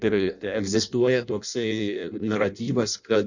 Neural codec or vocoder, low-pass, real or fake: codec, 16 kHz in and 24 kHz out, 0.6 kbps, FireRedTTS-2 codec; 7.2 kHz; fake